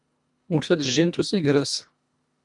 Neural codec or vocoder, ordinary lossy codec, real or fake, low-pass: codec, 24 kHz, 1.5 kbps, HILCodec; MP3, 96 kbps; fake; 10.8 kHz